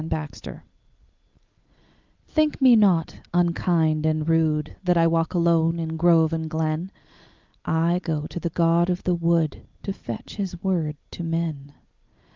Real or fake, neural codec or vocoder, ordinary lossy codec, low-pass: real; none; Opus, 32 kbps; 7.2 kHz